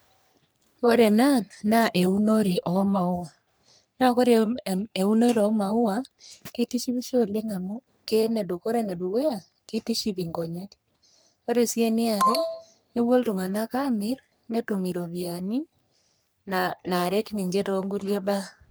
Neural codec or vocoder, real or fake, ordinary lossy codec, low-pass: codec, 44.1 kHz, 3.4 kbps, Pupu-Codec; fake; none; none